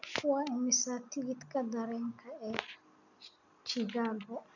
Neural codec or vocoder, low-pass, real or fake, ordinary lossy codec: none; 7.2 kHz; real; none